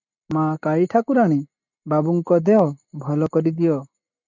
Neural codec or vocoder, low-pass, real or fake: none; 7.2 kHz; real